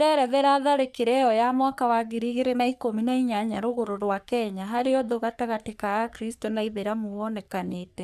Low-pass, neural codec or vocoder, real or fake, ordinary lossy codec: 14.4 kHz; codec, 44.1 kHz, 3.4 kbps, Pupu-Codec; fake; none